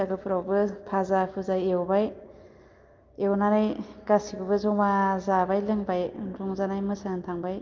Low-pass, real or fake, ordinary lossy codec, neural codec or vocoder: 7.2 kHz; real; Opus, 24 kbps; none